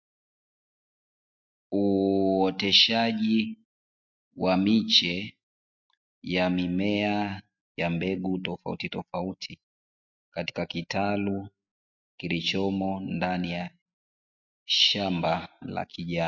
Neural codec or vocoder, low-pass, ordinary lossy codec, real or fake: none; 7.2 kHz; MP3, 48 kbps; real